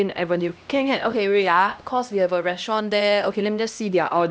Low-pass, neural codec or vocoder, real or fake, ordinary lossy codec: none; codec, 16 kHz, 1 kbps, X-Codec, HuBERT features, trained on LibriSpeech; fake; none